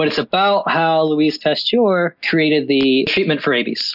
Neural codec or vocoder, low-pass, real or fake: none; 5.4 kHz; real